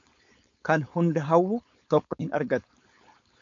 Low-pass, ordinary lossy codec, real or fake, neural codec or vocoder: 7.2 kHz; MP3, 64 kbps; fake; codec, 16 kHz, 4.8 kbps, FACodec